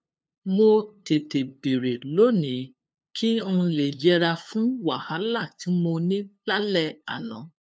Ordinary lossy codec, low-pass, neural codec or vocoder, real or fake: none; none; codec, 16 kHz, 2 kbps, FunCodec, trained on LibriTTS, 25 frames a second; fake